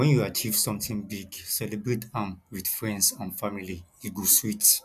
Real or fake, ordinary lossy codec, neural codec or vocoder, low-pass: real; none; none; 14.4 kHz